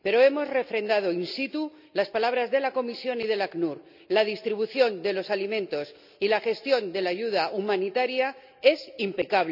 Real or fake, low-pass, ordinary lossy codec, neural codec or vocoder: real; 5.4 kHz; none; none